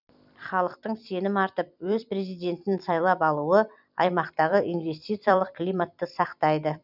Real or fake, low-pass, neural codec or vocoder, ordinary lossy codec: fake; 5.4 kHz; vocoder, 44.1 kHz, 128 mel bands every 256 samples, BigVGAN v2; none